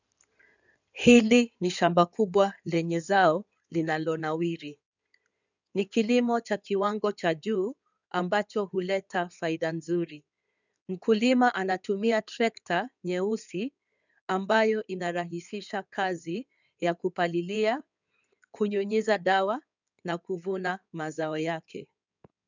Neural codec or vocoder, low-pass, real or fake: codec, 16 kHz in and 24 kHz out, 2.2 kbps, FireRedTTS-2 codec; 7.2 kHz; fake